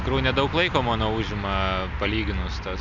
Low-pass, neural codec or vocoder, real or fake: 7.2 kHz; none; real